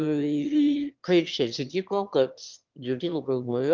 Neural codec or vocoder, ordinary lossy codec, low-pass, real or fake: autoencoder, 22.05 kHz, a latent of 192 numbers a frame, VITS, trained on one speaker; Opus, 32 kbps; 7.2 kHz; fake